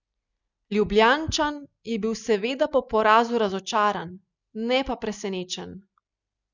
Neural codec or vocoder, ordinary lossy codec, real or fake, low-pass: none; none; real; 7.2 kHz